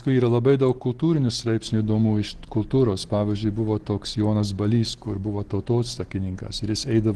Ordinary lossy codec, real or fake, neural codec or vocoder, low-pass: Opus, 16 kbps; real; none; 10.8 kHz